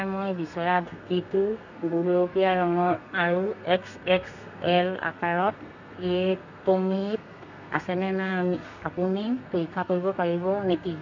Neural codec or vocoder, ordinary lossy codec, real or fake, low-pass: codec, 32 kHz, 1.9 kbps, SNAC; none; fake; 7.2 kHz